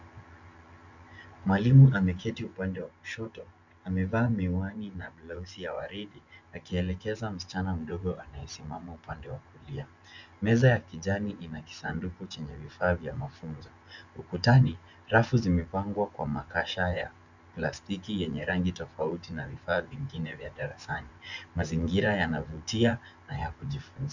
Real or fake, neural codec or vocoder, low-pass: fake; vocoder, 24 kHz, 100 mel bands, Vocos; 7.2 kHz